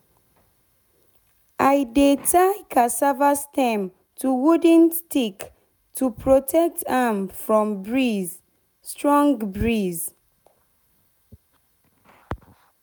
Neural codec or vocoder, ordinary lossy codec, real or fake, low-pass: none; none; real; none